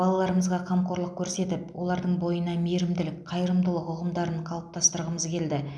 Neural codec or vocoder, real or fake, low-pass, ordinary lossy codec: none; real; none; none